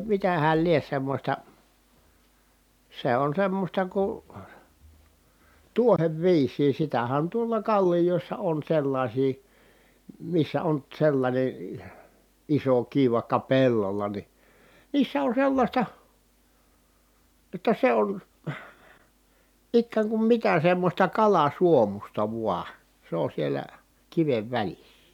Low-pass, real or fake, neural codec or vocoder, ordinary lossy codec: 19.8 kHz; real; none; none